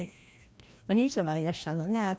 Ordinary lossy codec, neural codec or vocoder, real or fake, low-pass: none; codec, 16 kHz, 1 kbps, FreqCodec, larger model; fake; none